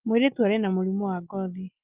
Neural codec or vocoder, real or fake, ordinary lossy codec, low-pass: none; real; Opus, 24 kbps; 3.6 kHz